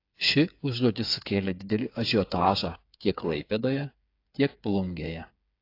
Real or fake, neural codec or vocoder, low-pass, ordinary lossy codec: fake; codec, 16 kHz, 8 kbps, FreqCodec, smaller model; 5.4 kHz; AAC, 32 kbps